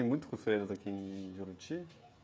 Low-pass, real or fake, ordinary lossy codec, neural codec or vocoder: none; fake; none; codec, 16 kHz, 16 kbps, FreqCodec, smaller model